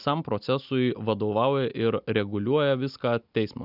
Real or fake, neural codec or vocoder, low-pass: real; none; 5.4 kHz